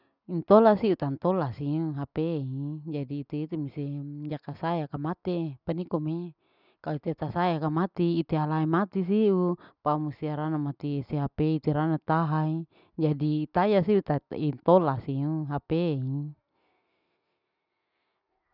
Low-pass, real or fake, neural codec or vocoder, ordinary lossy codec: 5.4 kHz; real; none; none